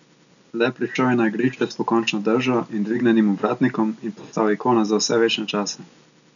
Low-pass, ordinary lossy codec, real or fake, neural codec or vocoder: 7.2 kHz; none; real; none